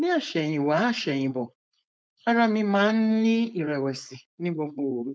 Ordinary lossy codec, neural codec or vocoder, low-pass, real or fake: none; codec, 16 kHz, 4.8 kbps, FACodec; none; fake